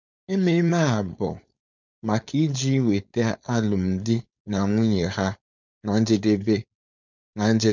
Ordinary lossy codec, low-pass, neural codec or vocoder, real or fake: none; 7.2 kHz; codec, 16 kHz, 4.8 kbps, FACodec; fake